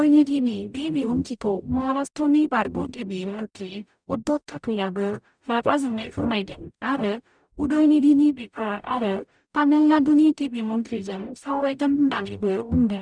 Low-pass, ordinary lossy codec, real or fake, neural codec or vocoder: 9.9 kHz; none; fake; codec, 44.1 kHz, 0.9 kbps, DAC